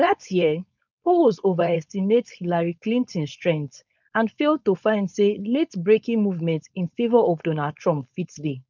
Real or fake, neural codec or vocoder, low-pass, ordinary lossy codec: fake; codec, 16 kHz, 4.8 kbps, FACodec; 7.2 kHz; none